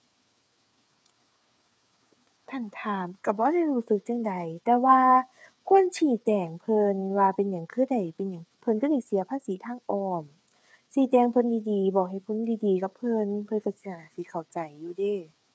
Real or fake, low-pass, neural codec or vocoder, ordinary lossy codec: fake; none; codec, 16 kHz, 8 kbps, FreqCodec, smaller model; none